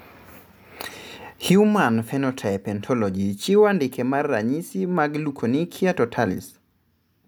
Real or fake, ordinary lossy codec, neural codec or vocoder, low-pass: real; none; none; none